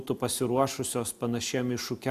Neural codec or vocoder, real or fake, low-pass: none; real; 14.4 kHz